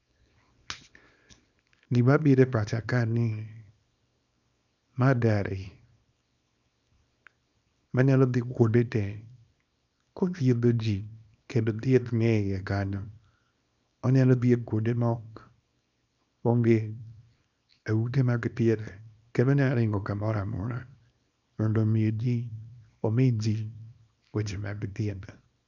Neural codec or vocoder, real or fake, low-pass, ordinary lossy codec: codec, 24 kHz, 0.9 kbps, WavTokenizer, small release; fake; 7.2 kHz; none